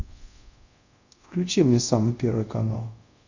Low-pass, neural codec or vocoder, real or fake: 7.2 kHz; codec, 24 kHz, 0.5 kbps, DualCodec; fake